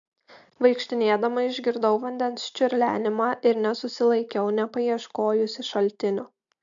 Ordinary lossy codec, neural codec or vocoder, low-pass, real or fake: AAC, 64 kbps; none; 7.2 kHz; real